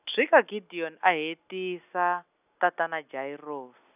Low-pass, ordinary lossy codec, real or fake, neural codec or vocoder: 3.6 kHz; none; real; none